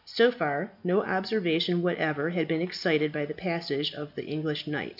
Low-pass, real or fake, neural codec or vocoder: 5.4 kHz; real; none